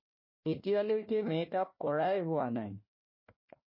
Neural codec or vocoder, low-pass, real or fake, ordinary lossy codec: codec, 16 kHz, 1 kbps, FunCodec, trained on Chinese and English, 50 frames a second; 5.4 kHz; fake; MP3, 24 kbps